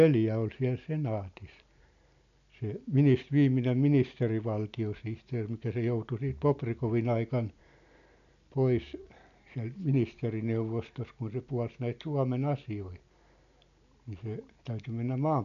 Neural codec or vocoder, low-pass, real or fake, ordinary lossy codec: none; 7.2 kHz; real; none